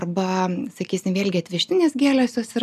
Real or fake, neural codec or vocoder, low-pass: real; none; 14.4 kHz